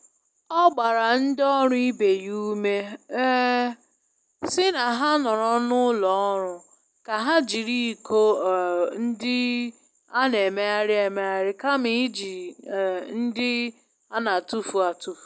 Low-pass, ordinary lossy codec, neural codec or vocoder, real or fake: none; none; none; real